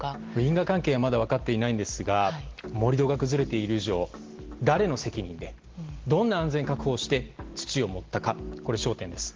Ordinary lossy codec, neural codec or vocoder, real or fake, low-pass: Opus, 16 kbps; none; real; 7.2 kHz